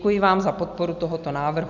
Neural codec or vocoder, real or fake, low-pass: none; real; 7.2 kHz